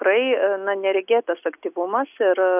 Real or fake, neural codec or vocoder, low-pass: real; none; 3.6 kHz